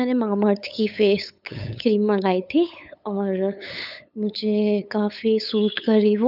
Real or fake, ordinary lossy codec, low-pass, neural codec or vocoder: fake; none; 5.4 kHz; codec, 16 kHz, 8 kbps, FunCodec, trained on LibriTTS, 25 frames a second